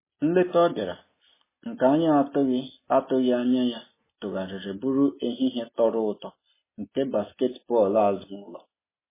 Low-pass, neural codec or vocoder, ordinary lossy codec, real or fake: 3.6 kHz; none; MP3, 16 kbps; real